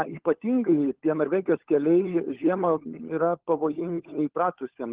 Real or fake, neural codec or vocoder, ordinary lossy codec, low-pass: fake; codec, 16 kHz, 16 kbps, FunCodec, trained on LibriTTS, 50 frames a second; Opus, 16 kbps; 3.6 kHz